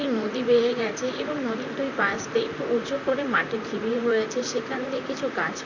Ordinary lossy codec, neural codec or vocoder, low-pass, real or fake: none; vocoder, 44.1 kHz, 128 mel bands, Pupu-Vocoder; 7.2 kHz; fake